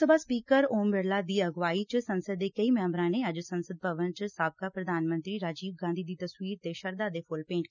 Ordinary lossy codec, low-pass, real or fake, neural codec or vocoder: none; 7.2 kHz; real; none